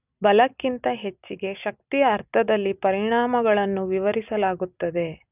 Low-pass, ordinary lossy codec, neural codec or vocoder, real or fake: 3.6 kHz; none; none; real